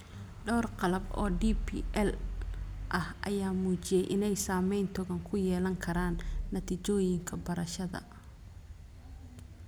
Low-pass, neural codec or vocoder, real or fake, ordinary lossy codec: none; none; real; none